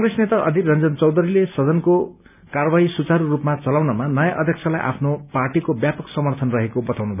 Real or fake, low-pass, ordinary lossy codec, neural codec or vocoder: real; 3.6 kHz; none; none